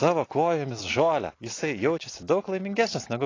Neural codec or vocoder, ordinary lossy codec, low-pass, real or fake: vocoder, 44.1 kHz, 128 mel bands every 256 samples, BigVGAN v2; AAC, 32 kbps; 7.2 kHz; fake